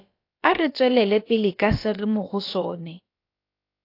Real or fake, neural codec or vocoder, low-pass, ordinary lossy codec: fake; codec, 16 kHz, about 1 kbps, DyCAST, with the encoder's durations; 5.4 kHz; AAC, 32 kbps